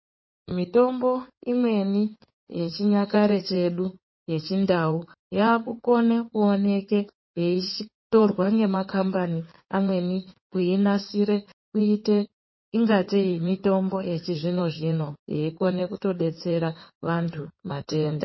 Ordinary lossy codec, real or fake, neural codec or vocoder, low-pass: MP3, 24 kbps; fake; codec, 16 kHz in and 24 kHz out, 2.2 kbps, FireRedTTS-2 codec; 7.2 kHz